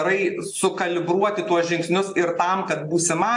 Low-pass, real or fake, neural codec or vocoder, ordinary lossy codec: 10.8 kHz; real; none; AAC, 64 kbps